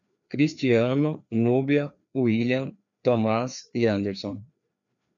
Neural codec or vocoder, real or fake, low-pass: codec, 16 kHz, 2 kbps, FreqCodec, larger model; fake; 7.2 kHz